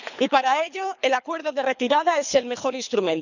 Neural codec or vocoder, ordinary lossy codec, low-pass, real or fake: codec, 24 kHz, 3 kbps, HILCodec; none; 7.2 kHz; fake